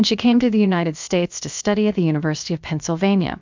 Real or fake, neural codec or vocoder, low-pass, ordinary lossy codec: fake; codec, 16 kHz, about 1 kbps, DyCAST, with the encoder's durations; 7.2 kHz; MP3, 64 kbps